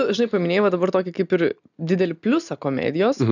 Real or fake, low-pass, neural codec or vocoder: real; 7.2 kHz; none